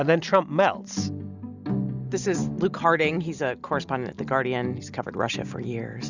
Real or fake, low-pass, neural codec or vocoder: real; 7.2 kHz; none